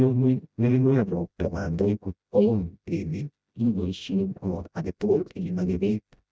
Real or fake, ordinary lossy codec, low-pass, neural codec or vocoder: fake; none; none; codec, 16 kHz, 0.5 kbps, FreqCodec, smaller model